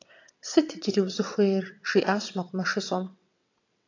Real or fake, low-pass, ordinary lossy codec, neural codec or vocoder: fake; 7.2 kHz; AAC, 48 kbps; vocoder, 22.05 kHz, 80 mel bands, WaveNeXt